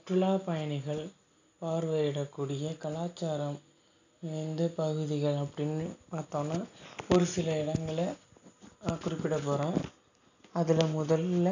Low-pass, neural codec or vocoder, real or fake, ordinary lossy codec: 7.2 kHz; none; real; none